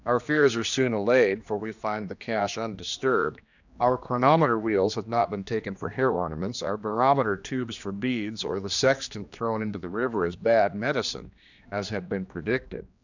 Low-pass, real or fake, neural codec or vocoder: 7.2 kHz; fake; codec, 16 kHz, 2 kbps, X-Codec, HuBERT features, trained on general audio